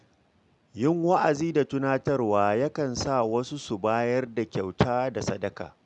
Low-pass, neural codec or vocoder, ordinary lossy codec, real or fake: 10.8 kHz; none; none; real